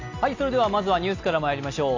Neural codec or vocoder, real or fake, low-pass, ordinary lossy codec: none; real; 7.2 kHz; AAC, 48 kbps